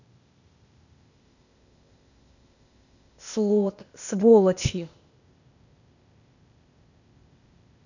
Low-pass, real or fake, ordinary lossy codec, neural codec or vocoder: 7.2 kHz; fake; none; codec, 16 kHz, 0.8 kbps, ZipCodec